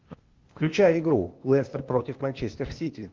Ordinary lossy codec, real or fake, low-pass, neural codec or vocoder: Opus, 32 kbps; fake; 7.2 kHz; codec, 16 kHz, 0.8 kbps, ZipCodec